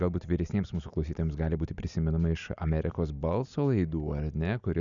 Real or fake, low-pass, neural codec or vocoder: real; 7.2 kHz; none